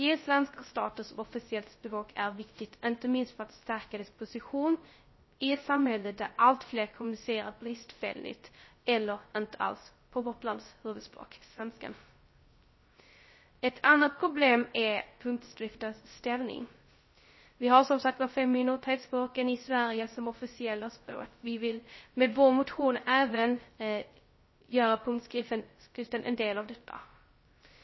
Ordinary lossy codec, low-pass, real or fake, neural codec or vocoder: MP3, 24 kbps; 7.2 kHz; fake; codec, 16 kHz, 0.3 kbps, FocalCodec